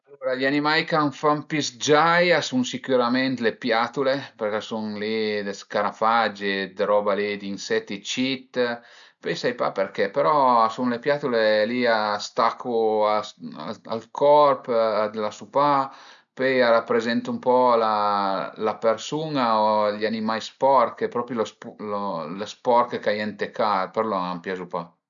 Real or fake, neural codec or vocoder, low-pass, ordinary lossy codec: real; none; 7.2 kHz; none